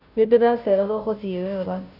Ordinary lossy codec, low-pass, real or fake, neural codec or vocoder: none; 5.4 kHz; fake; codec, 16 kHz, 0.5 kbps, FunCodec, trained on Chinese and English, 25 frames a second